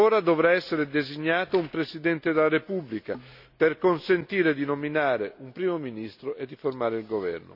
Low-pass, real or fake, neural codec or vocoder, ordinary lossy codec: 5.4 kHz; real; none; none